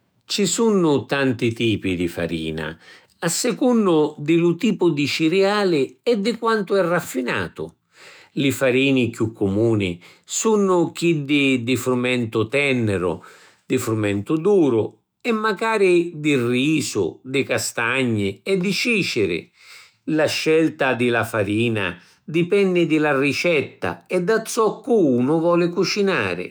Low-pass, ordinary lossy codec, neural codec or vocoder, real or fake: none; none; autoencoder, 48 kHz, 128 numbers a frame, DAC-VAE, trained on Japanese speech; fake